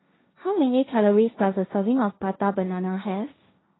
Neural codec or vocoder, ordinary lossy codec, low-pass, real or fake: codec, 16 kHz, 1.1 kbps, Voila-Tokenizer; AAC, 16 kbps; 7.2 kHz; fake